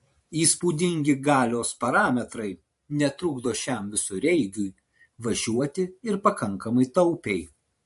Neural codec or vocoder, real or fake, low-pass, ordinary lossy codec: vocoder, 44.1 kHz, 128 mel bands every 512 samples, BigVGAN v2; fake; 14.4 kHz; MP3, 48 kbps